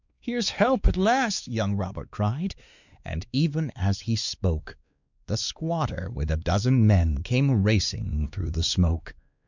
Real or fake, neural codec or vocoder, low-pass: fake; codec, 16 kHz, 2 kbps, X-Codec, WavLM features, trained on Multilingual LibriSpeech; 7.2 kHz